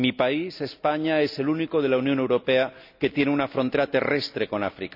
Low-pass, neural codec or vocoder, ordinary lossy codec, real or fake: 5.4 kHz; none; none; real